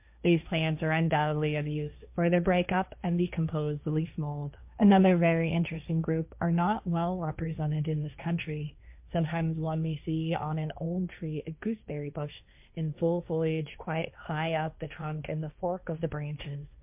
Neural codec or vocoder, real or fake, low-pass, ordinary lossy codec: codec, 16 kHz, 1.1 kbps, Voila-Tokenizer; fake; 3.6 kHz; MP3, 32 kbps